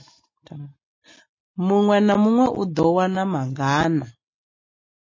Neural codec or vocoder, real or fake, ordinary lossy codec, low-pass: none; real; MP3, 32 kbps; 7.2 kHz